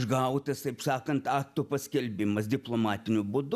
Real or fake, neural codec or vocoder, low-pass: real; none; 14.4 kHz